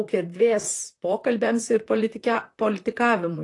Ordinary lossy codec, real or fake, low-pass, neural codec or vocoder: AAC, 48 kbps; fake; 10.8 kHz; vocoder, 44.1 kHz, 128 mel bands, Pupu-Vocoder